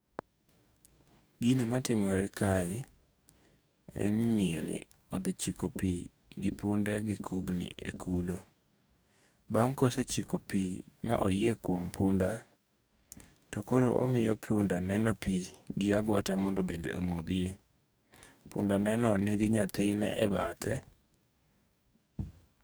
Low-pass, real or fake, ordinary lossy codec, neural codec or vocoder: none; fake; none; codec, 44.1 kHz, 2.6 kbps, DAC